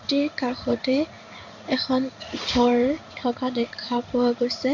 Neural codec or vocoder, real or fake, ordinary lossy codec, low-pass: codec, 16 kHz in and 24 kHz out, 1 kbps, XY-Tokenizer; fake; none; 7.2 kHz